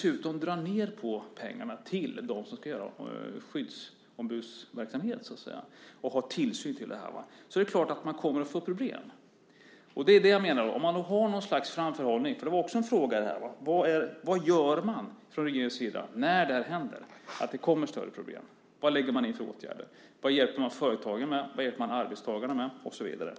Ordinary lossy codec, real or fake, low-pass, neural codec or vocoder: none; real; none; none